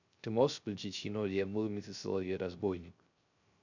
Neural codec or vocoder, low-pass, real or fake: codec, 16 kHz, 0.3 kbps, FocalCodec; 7.2 kHz; fake